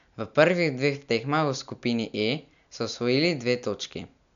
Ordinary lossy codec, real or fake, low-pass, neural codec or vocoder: none; real; 7.2 kHz; none